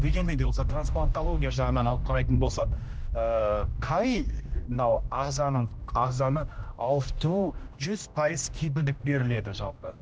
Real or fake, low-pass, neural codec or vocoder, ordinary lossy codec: fake; none; codec, 16 kHz, 1 kbps, X-Codec, HuBERT features, trained on general audio; none